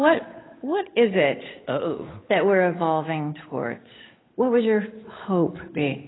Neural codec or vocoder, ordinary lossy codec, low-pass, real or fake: codec, 16 kHz, 8 kbps, FunCodec, trained on Chinese and English, 25 frames a second; AAC, 16 kbps; 7.2 kHz; fake